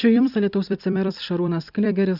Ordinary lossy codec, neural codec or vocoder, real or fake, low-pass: Opus, 64 kbps; vocoder, 44.1 kHz, 128 mel bands every 256 samples, BigVGAN v2; fake; 5.4 kHz